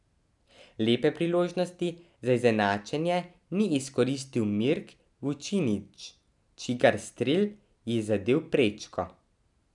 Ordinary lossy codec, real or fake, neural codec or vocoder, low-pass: none; real; none; 10.8 kHz